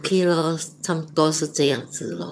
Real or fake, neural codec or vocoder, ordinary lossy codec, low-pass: fake; vocoder, 22.05 kHz, 80 mel bands, HiFi-GAN; none; none